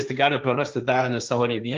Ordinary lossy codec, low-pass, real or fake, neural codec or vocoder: Opus, 24 kbps; 7.2 kHz; fake; codec, 16 kHz, 1.1 kbps, Voila-Tokenizer